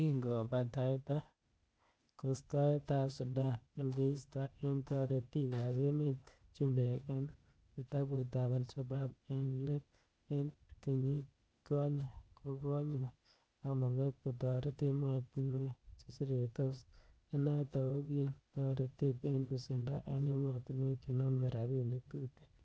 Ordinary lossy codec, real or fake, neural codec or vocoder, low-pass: none; fake; codec, 16 kHz, 0.8 kbps, ZipCodec; none